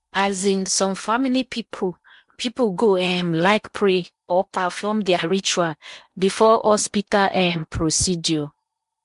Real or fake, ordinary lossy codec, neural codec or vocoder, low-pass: fake; MP3, 64 kbps; codec, 16 kHz in and 24 kHz out, 0.8 kbps, FocalCodec, streaming, 65536 codes; 10.8 kHz